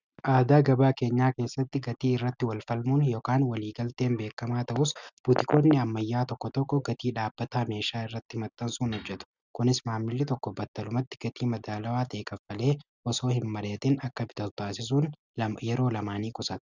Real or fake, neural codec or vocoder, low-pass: real; none; 7.2 kHz